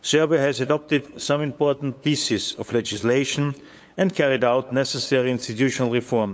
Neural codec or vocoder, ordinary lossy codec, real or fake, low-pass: codec, 16 kHz, 8 kbps, FunCodec, trained on LibriTTS, 25 frames a second; none; fake; none